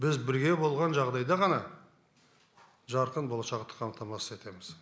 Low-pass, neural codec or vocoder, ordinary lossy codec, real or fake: none; none; none; real